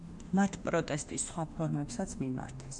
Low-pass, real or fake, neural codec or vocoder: 10.8 kHz; fake; autoencoder, 48 kHz, 32 numbers a frame, DAC-VAE, trained on Japanese speech